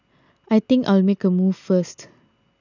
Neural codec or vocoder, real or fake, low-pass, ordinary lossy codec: none; real; 7.2 kHz; none